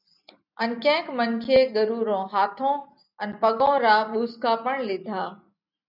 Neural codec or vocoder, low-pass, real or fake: none; 5.4 kHz; real